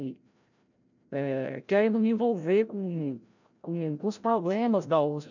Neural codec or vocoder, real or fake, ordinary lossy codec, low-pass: codec, 16 kHz, 0.5 kbps, FreqCodec, larger model; fake; none; 7.2 kHz